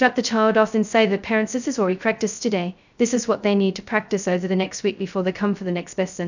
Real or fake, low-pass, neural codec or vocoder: fake; 7.2 kHz; codec, 16 kHz, 0.2 kbps, FocalCodec